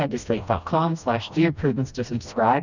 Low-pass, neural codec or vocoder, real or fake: 7.2 kHz; codec, 16 kHz, 1 kbps, FreqCodec, smaller model; fake